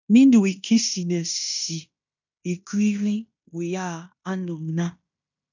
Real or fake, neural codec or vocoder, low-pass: fake; codec, 16 kHz in and 24 kHz out, 0.9 kbps, LongCat-Audio-Codec, fine tuned four codebook decoder; 7.2 kHz